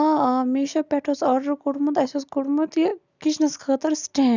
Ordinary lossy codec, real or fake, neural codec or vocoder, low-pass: none; real; none; 7.2 kHz